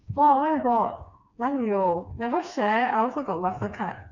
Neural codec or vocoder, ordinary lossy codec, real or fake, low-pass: codec, 16 kHz, 2 kbps, FreqCodec, smaller model; none; fake; 7.2 kHz